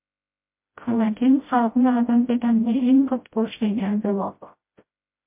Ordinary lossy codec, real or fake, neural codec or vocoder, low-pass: MP3, 32 kbps; fake; codec, 16 kHz, 0.5 kbps, FreqCodec, smaller model; 3.6 kHz